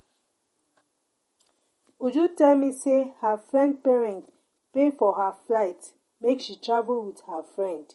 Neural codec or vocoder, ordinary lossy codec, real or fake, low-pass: vocoder, 48 kHz, 128 mel bands, Vocos; MP3, 48 kbps; fake; 19.8 kHz